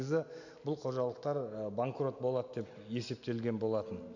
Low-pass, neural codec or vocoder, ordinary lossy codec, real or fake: 7.2 kHz; none; none; real